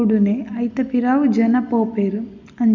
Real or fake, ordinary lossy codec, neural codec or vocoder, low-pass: real; none; none; 7.2 kHz